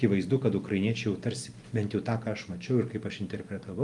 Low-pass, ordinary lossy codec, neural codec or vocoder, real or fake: 10.8 kHz; Opus, 32 kbps; none; real